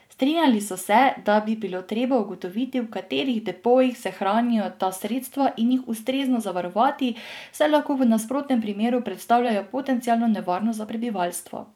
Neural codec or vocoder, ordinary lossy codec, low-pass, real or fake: vocoder, 44.1 kHz, 128 mel bands every 512 samples, BigVGAN v2; none; 19.8 kHz; fake